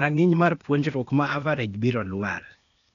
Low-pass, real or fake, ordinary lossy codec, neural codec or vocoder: 7.2 kHz; fake; MP3, 96 kbps; codec, 16 kHz, 0.8 kbps, ZipCodec